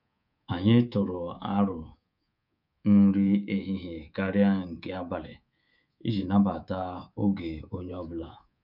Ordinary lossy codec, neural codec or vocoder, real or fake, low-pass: none; codec, 24 kHz, 3.1 kbps, DualCodec; fake; 5.4 kHz